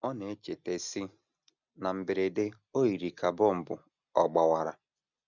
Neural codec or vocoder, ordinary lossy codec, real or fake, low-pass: none; none; real; 7.2 kHz